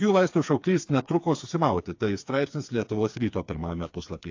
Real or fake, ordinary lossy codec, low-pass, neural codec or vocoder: fake; AAC, 48 kbps; 7.2 kHz; codec, 16 kHz, 4 kbps, FreqCodec, smaller model